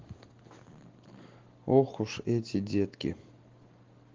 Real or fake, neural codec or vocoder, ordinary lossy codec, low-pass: real; none; Opus, 16 kbps; 7.2 kHz